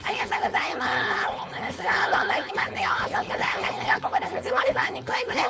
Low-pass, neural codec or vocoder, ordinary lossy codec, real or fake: none; codec, 16 kHz, 4.8 kbps, FACodec; none; fake